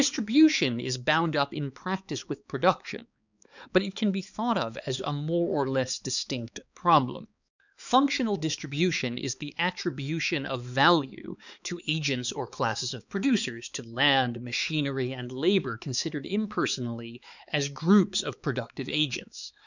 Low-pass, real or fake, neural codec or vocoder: 7.2 kHz; fake; codec, 16 kHz, 4 kbps, X-Codec, HuBERT features, trained on balanced general audio